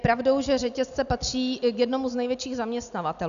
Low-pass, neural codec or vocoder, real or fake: 7.2 kHz; none; real